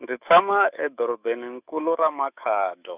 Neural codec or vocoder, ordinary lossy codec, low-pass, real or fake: autoencoder, 48 kHz, 128 numbers a frame, DAC-VAE, trained on Japanese speech; Opus, 64 kbps; 3.6 kHz; fake